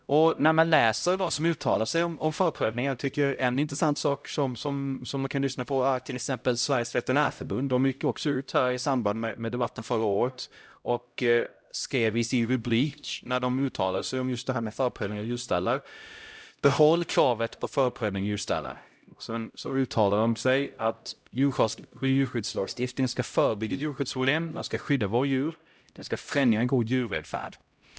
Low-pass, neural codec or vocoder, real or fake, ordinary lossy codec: none; codec, 16 kHz, 0.5 kbps, X-Codec, HuBERT features, trained on LibriSpeech; fake; none